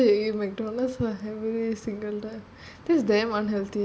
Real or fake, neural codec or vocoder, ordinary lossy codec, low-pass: real; none; none; none